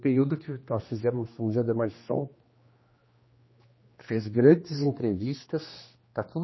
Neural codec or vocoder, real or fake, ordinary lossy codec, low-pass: codec, 16 kHz, 2 kbps, X-Codec, HuBERT features, trained on general audio; fake; MP3, 24 kbps; 7.2 kHz